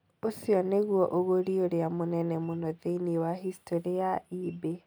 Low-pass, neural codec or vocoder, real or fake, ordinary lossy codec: none; none; real; none